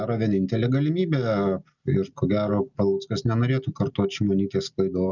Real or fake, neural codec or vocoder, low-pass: real; none; 7.2 kHz